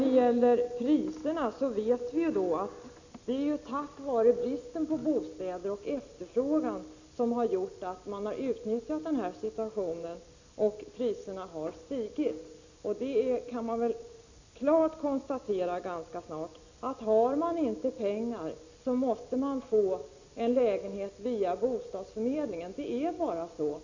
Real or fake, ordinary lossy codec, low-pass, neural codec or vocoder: real; Opus, 64 kbps; 7.2 kHz; none